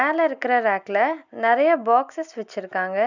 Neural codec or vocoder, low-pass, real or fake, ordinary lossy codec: none; 7.2 kHz; real; none